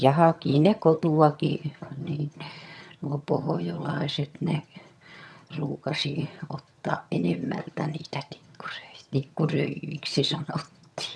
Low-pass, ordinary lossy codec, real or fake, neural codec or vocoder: none; none; fake; vocoder, 22.05 kHz, 80 mel bands, HiFi-GAN